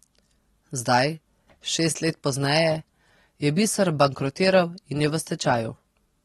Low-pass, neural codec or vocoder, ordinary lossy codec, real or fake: 19.8 kHz; none; AAC, 32 kbps; real